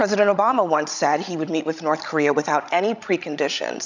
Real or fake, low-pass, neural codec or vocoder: fake; 7.2 kHz; codec, 16 kHz, 16 kbps, FreqCodec, larger model